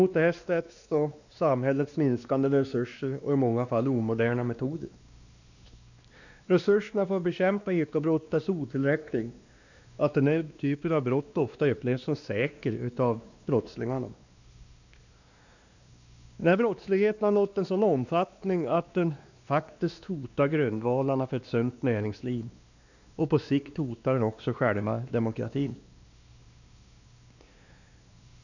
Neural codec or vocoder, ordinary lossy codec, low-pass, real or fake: codec, 16 kHz, 2 kbps, X-Codec, WavLM features, trained on Multilingual LibriSpeech; none; 7.2 kHz; fake